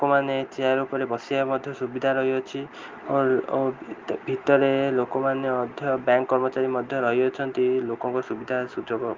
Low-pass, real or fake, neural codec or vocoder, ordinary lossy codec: 7.2 kHz; real; none; Opus, 24 kbps